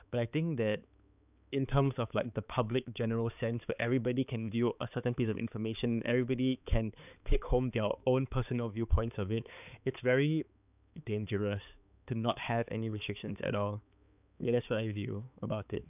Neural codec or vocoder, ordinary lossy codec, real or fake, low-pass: codec, 16 kHz, 4 kbps, X-Codec, HuBERT features, trained on balanced general audio; none; fake; 3.6 kHz